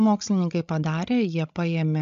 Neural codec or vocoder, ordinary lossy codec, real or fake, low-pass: codec, 16 kHz, 16 kbps, FreqCodec, larger model; AAC, 64 kbps; fake; 7.2 kHz